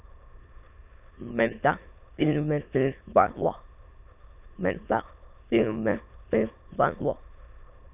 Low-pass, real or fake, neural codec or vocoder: 3.6 kHz; fake; autoencoder, 22.05 kHz, a latent of 192 numbers a frame, VITS, trained on many speakers